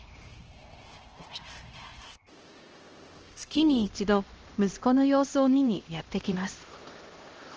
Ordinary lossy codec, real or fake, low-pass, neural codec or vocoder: Opus, 16 kbps; fake; 7.2 kHz; codec, 16 kHz, 1 kbps, X-Codec, HuBERT features, trained on LibriSpeech